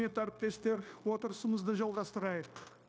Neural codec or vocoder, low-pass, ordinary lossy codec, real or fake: codec, 16 kHz, 0.9 kbps, LongCat-Audio-Codec; none; none; fake